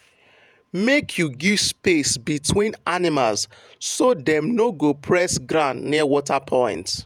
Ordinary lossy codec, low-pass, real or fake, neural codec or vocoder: none; 19.8 kHz; fake; vocoder, 48 kHz, 128 mel bands, Vocos